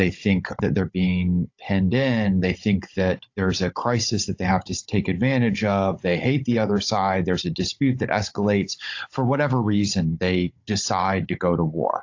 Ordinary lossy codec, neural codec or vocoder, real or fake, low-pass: AAC, 48 kbps; codec, 16 kHz, 16 kbps, FunCodec, trained on Chinese and English, 50 frames a second; fake; 7.2 kHz